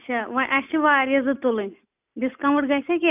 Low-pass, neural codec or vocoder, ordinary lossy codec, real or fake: 3.6 kHz; none; none; real